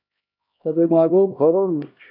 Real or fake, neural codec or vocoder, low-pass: fake; codec, 16 kHz, 1 kbps, X-Codec, HuBERT features, trained on LibriSpeech; 5.4 kHz